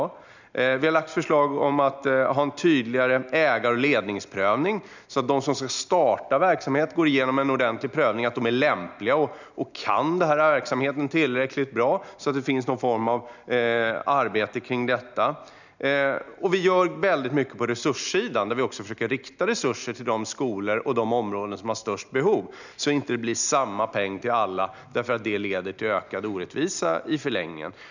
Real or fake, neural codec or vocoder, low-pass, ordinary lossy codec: real; none; 7.2 kHz; none